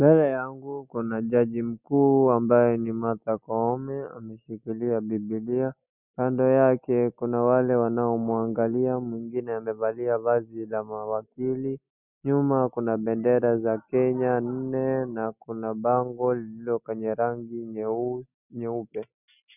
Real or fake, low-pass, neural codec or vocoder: real; 3.6 kHz; none